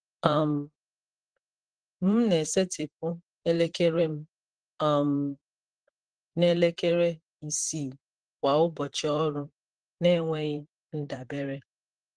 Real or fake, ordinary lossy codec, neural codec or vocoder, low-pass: fake; Opus, 16 kbps; vocoder, 44.1 kHz, 128 mel bands, Pupu-Vocoder; 9.9 kHz